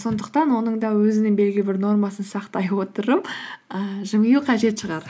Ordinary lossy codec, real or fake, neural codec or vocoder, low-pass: none; real; none; none